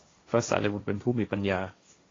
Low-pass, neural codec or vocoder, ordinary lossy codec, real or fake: 7.2 kHz; codec, 16 kHz, 1.1 kbps, Voila-Tokenizer; AAC, 32 kbps; fake